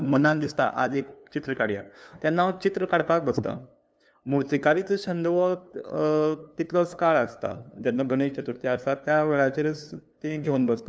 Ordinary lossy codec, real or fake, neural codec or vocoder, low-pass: none; fake; codec, 16 kHz, 2 kbps, FunCodec, trained on LibriTTS, 25 frames a second; none